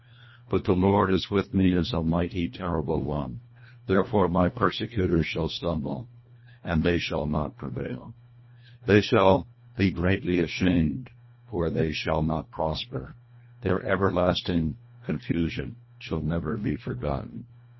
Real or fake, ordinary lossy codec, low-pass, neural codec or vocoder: fake; MP3, 24 kbps; 7.2 kHz; codec, 24 kHz, 1.5 kbps, HILCodec